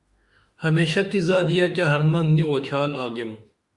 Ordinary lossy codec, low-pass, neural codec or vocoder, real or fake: Opus, 64 kbps; 10.8 kHz; autoencoder, 48 kHz, 32 numbers a frame, DAC-VAE, trained on Japanese speech; fake